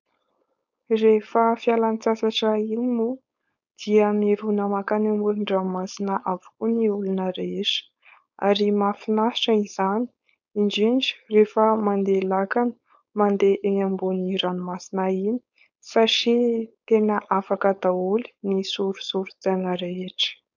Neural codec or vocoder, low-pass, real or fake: codec, 16 kHz, 4.8 kbps, FACodec; 7.2 kHz; fake